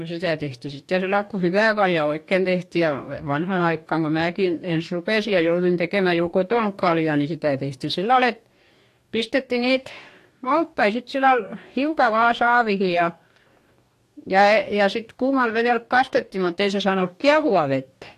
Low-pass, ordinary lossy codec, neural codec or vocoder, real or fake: 14.4 kHz; AAC, 64 kbps; codec, 44.1 kHz, 2.6 kbps, DAC; fake